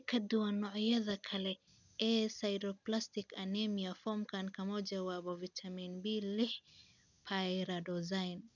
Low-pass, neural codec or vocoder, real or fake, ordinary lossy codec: 7.2 kHz; none; real; none